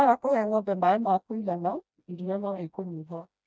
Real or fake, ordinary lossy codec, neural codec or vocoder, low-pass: fake; none; codec, 16 kHz, 1 kbps, FreqCodec, smaller model; none